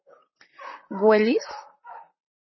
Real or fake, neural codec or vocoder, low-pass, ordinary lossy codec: fake; vocoder, 44.1 kHz, 128 mel bands, Pupu-Vocoder; 7.2 kHz; MP3, 24 kbps